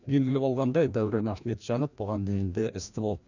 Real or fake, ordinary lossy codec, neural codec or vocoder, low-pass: fake; none; codec, 16 kHz, 1 kbps, FreqCodec, larger model; 7.2 kHz